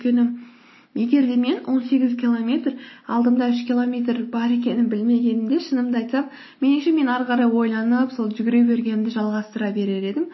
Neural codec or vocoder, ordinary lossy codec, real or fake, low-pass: none; MP3, 24 kbps; real; 7.2 kHz